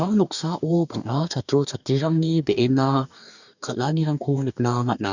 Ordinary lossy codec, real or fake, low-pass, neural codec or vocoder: none; fake; 7.2 kHz; codec, 44.1 kHz, 2.6 kbps, DAC